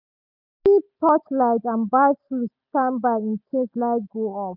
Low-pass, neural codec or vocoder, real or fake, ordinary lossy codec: 5.4 kHz; none; real; none